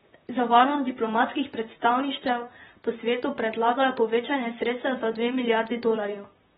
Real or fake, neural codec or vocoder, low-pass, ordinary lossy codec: fake; vocoder, 44.1 kHz, 128 mel bands, Pupu-Vocoder; 19.8 kHz; AAC, 16 kbps